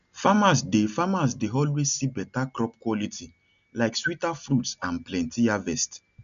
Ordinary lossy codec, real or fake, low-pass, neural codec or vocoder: none; real; 7.2 kHz; none